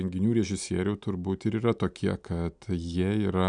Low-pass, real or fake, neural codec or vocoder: 9.9 kHz; real; none